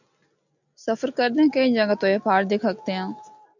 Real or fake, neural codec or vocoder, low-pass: real; none; 7.2 kHz